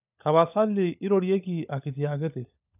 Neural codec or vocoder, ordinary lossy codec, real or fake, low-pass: codec, 16 kHz, 16 kbps, FunCodec, trained on LibriTTS, 50 frames a second; none; fake; 3.6 kHz